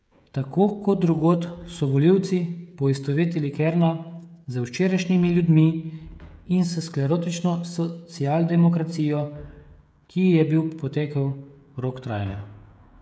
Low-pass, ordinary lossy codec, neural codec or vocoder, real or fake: none; none; codec, 16 kHz, 16 kbps, FreqCodec, smaller model; fake